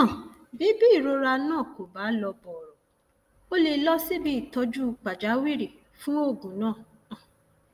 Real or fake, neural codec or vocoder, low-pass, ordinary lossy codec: real; none; 14.4 kHz; Opus, 32 kbps